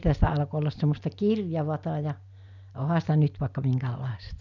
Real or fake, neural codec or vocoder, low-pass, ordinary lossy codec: real; none; 7.2 kHz; none